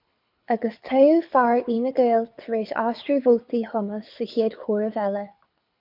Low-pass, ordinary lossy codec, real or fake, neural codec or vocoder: 5.4 kHz; MP3, 48 kbps; fake; codec, 24 kHz, 6 kbps, HILCodec